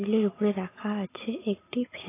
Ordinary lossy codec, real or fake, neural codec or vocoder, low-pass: AAC, 16 kbps; real; none; 3.6 kHz